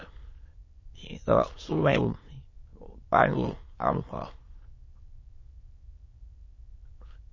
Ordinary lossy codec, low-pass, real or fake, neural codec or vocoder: MP3, 32 kbps; 7.2 kHz; fake; autoencoder, 22.05 kHz, a latent of 192 numbers a frame, VITS, trained on many speakers